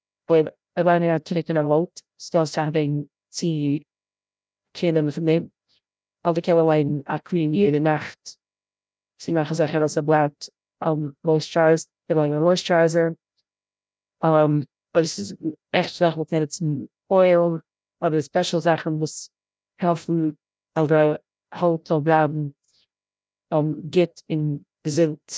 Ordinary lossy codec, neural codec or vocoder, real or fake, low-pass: none; codec, 16 kHz, 0.5 kbps, FreqCodec, larger model; fake; none